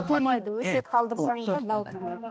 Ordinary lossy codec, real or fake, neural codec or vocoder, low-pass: none; fake; codec, 16 kHz, 1 kbps, X-Codec, HuBERT features, trained on balanced general audio; none